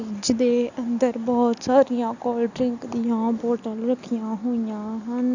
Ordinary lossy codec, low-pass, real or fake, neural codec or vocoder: none; 7.2 kHz; real; none